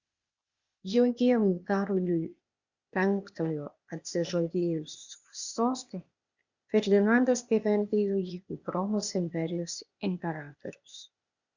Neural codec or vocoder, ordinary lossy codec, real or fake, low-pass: codec, 16 kHz, 0.8 kbps, ZipCodec; Opus, 64 kbps; fake; 7.2 kHz